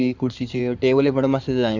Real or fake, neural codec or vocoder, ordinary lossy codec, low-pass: fake; codec, 16 kHz in and 24 kHz out, 2.2 kbps, FireRedTTS-2 codec; none; 7.2 kHz